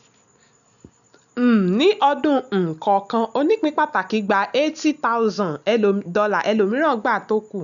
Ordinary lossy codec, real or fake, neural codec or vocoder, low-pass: none; real; none; 7.2 kHz